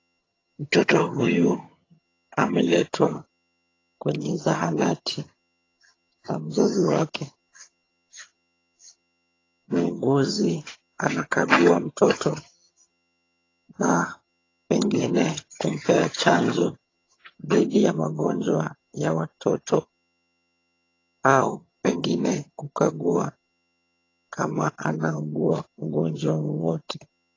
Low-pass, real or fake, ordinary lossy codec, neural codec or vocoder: 7.2 kHz; fake; AAC, 32 kbps; vocoder, 22.05 kHz, 80 mel bands, HiFi-GAN